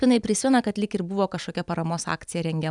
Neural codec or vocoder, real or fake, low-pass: none; real; 10.8 kHz